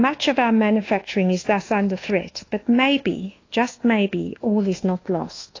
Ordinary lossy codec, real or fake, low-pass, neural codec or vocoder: AAC, 32 kbps; fake; 7.2 kHz; codec, 24 kHz, 1.2 kbps, DualCodec